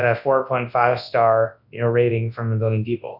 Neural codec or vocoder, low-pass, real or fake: codec, 24 kHz, 0.9 kbps, WavTokenizer, large speech release; 5.4 kHz; fake